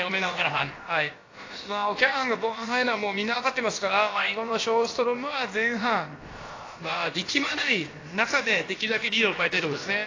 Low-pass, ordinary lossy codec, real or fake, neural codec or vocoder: 7.2 kHz; AAC, 32 kbps; fake; codec, 16 kHz, about 1 kbps, DyCAST, with the encoder's durations